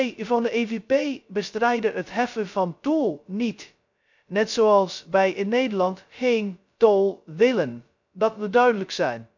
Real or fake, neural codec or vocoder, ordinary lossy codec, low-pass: fake; codec, 16 kHz, 0.2 kbps, FocalCodec; none; 7.2 kHz